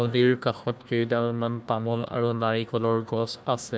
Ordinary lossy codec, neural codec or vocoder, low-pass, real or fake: none; codec, 16 kHz, 1 kbps, FunCodec, trained on Chinese and English, 50 frames a second; none; fake